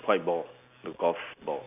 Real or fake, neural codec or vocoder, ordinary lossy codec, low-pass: real; none; AAC, 32 kbps; 3.6 kHz